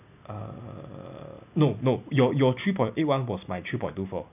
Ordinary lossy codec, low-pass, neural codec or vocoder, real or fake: none; 3.6 kHz; none; real